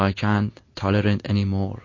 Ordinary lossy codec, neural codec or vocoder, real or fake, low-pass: MP3, 32 kbps; none; real; 7.2 kHz